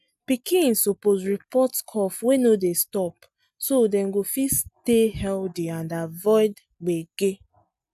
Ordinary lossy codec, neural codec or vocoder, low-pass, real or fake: none; none; 14.4 kHz; real